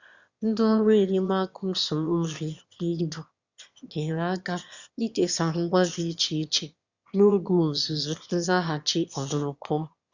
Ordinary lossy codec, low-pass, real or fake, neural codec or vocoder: Opus, 64 kbps; 7.2 kHz; fake; autoencoder, 22.05 kHz, a latent of 192 numbers a frame, VITS, trained on one speaker